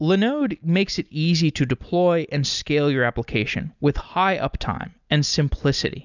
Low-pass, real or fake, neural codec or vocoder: 7.2 kHz; real; none